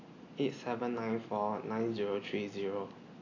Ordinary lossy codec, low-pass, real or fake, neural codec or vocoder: AAC, 32 kbps; 7.2 kHz; real; none